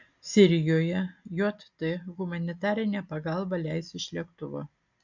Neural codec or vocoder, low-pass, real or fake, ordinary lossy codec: none; 7.2 kHz; real; MP3, 64 kbps